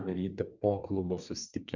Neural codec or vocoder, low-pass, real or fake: codec, 24 kHz, 1 kbps, SNAC; 7.2 kHz; fake